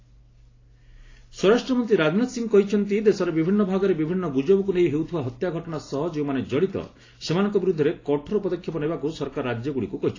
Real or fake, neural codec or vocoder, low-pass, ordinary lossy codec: real; none; 7.2 kHz; AAC, 32 kbps